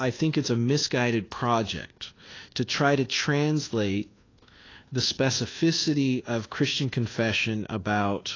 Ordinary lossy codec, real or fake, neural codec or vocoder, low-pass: AAC, 32 kbps; fake; codec, 24 kHz, 1.2 kbps, DualCodec; 7.2 kHz